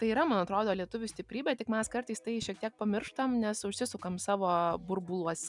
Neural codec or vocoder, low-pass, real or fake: none; 10.8 kHz; real